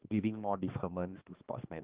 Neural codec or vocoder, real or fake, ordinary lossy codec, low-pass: codec, 16 kHz, 2 kbps, X-Codec, HuBERT features, trained on balanced general audio; fake; Opus, 16 kbps; 3.6 kHz